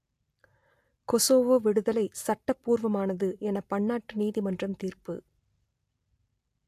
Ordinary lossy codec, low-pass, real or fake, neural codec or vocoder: AAC, 64 kbps; 14.4 kHz; real; none